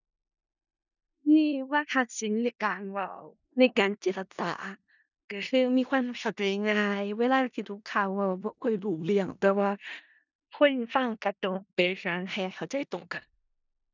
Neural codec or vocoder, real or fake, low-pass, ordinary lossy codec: codec, 16 kHz in and 24 kHz out, 0.4 kbps, LongCat-Audio-Codec, four codebook decoder; fake; 7.2 kHz; none